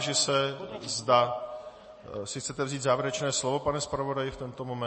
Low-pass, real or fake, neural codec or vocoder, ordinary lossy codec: 10.8 kHz; real; none; MP3, 32 kbps